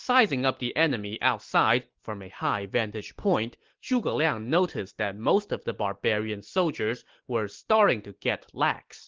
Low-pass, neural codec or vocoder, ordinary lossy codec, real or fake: 7.2 kHz; none; Opus, 16 kbps; real